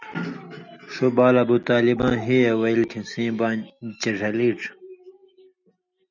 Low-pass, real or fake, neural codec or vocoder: 7.2 kHz; real; none